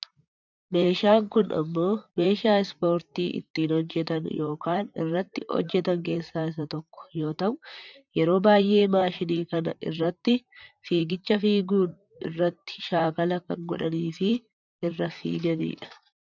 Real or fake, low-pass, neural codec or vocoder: fake; 7.2 kHz; vocoder, 44.1 kHz, 128 mel bands, Pupu-Vocoder